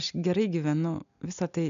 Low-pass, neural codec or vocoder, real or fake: 7.2 kHz; none; real